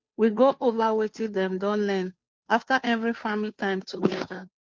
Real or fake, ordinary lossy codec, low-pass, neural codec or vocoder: fake; none; none; codec, 16 kHz, 2 kbps, FunCodec, trained on Chinese and English, 25 frames a second